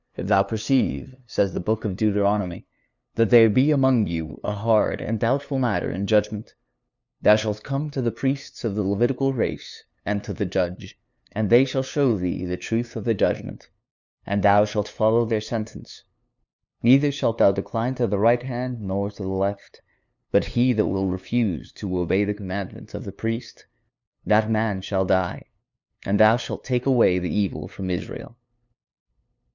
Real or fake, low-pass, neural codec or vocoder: fake; 7.2 kHz; codec, 16 kHz, 2 kbps, FunCodec, trained on LibriTTS, 25 frames a second